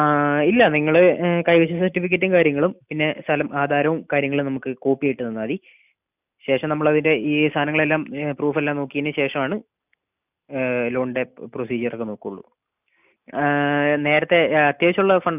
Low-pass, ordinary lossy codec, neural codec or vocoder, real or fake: 3.6 kHz; none; none; real